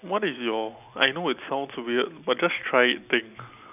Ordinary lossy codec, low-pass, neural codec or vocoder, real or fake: none; 3.6 kHz; none; real